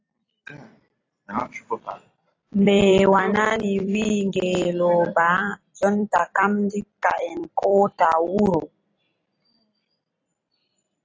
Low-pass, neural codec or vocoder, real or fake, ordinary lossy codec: 7.2 kHz; vocoder, 44.1 kHz, 128 mel bands every 256 samples, BigVGAN v2; fake; AAC, 32 kbps